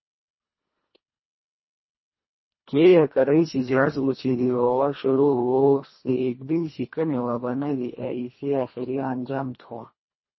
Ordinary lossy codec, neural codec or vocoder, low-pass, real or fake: MP3, 24 kbps; codec, 24 kHz, 1.5 kbps, HILCodec; 7.2 kHz; fake